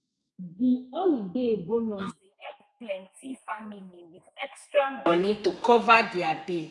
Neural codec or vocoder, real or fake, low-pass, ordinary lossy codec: codec, 32 kHz, 1.9 kbps, SNAC; fake; 10.8 kHz; none